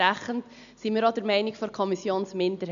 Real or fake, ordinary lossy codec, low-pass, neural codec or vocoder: real; none; 7.2 kHz; none